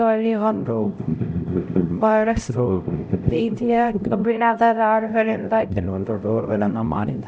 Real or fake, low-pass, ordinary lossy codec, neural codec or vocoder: fake; none; none; codec, 16 kHz, 0.5 kbps, X-Codec, HuBERT features, trained on LibriSpeech